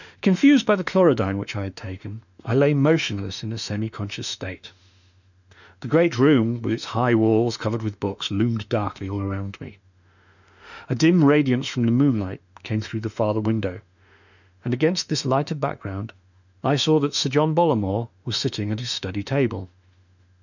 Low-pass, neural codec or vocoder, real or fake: 7.2 kHz; autoencoder, 48 kHz, 32 numbers a frame, DAC-VAE, trained on Japanese speech; fake